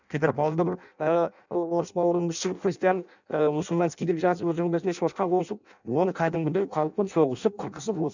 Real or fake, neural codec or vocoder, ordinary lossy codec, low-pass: fake; codec, 16 kHz in and 24 kHz out, 0.6 kbps, FireRedTTS-2 codec; none; 7.2 kHz